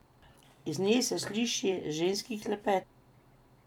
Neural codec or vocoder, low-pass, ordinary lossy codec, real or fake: vocoder, 44.1 kHz, 128 mel bands every 256 samples, BigVGAN v2; 19.8 kHz; none; fake